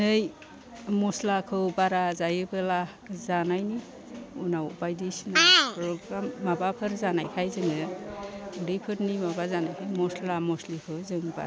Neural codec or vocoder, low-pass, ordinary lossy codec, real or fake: none; none; none; real